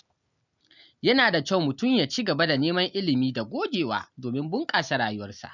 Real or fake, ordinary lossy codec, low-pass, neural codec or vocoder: real; none; 7.2 kHz; none